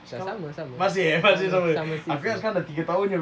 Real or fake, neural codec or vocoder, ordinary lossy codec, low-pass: real; none; none; none